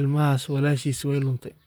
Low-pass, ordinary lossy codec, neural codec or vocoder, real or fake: none; none; vocoder, 44.1 kHz, 128 mel bands, Pupu-Vocoder; fake